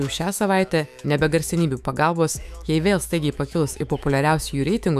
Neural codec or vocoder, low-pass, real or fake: autoencoder, 48 kHz, 128 numbers a frame, DAC-VAE, trained on Japanese speech; 14.4 kHz; fake